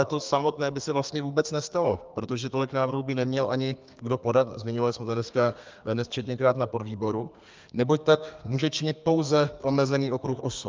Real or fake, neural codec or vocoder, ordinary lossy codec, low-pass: fake; codec, 32 kHz, 1.9 kbps, SNAC; Opus, 24 kbps; 7.2 kHz